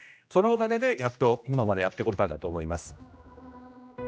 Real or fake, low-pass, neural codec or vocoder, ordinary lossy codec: fake; none; codec, 16 kHz, 1 kbps, X-Codec, HuBERT features, trained on general audio; none